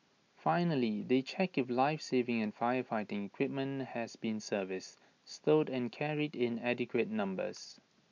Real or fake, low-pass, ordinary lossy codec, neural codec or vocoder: real; 7.2 kHz; MP3, 64 kbps; none